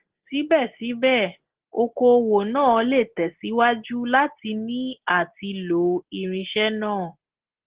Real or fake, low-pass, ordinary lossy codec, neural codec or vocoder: real; 3.6 kHz; Opus, 16 kbps; none